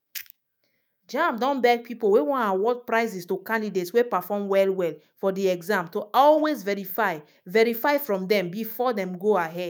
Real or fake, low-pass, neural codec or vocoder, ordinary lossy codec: fake; none; autoencoder, 48 kHz, 128 numbers a frame, DAC-VAE, trained on Japanese speech; none